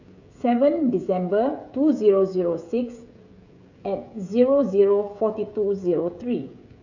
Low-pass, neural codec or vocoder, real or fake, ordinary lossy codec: 7.2 kHz; codec, 16 kHz, 16 kbps, FreqCodec, smaller model; fake; none